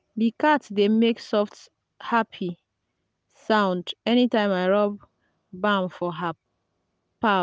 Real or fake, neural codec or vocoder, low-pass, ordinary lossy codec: real; none; none; none